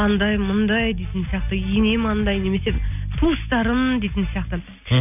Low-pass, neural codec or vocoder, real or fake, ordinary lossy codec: 3.6 kHz; none; real; none